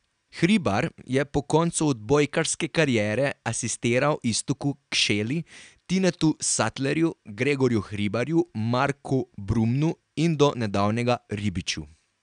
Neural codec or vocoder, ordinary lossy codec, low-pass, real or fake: none; none; 9.9 kHz; real